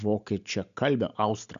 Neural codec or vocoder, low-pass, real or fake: none; 7.2 kHz; real